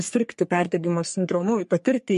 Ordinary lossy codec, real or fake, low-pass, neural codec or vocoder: MP3, 48 kbps; fake; 14.4 kHz; codec, 44.1 kHz, 3.4 kbps, Pupu-Codec